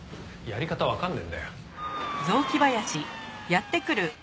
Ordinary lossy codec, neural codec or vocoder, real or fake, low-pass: none; none; real; none